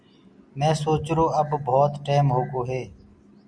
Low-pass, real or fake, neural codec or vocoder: 9.9 kHz; real; none